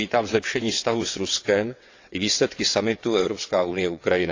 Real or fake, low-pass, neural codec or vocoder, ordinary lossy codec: fake; 7.2 kHz; vocoder, 22.05 kHz, 80 mel bands, WaveNeXt; AAC, 48 kbps